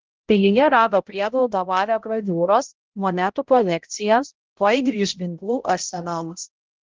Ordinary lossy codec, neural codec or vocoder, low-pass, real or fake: Opus, 16 kbps; codec, 16 kHz, 0.5 kbps, X-Codec, HuBERT features, trained on balanced general audio; 7.2 kHz; fake